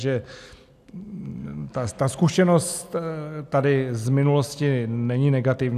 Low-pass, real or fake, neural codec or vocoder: 14.4 kHz; real; none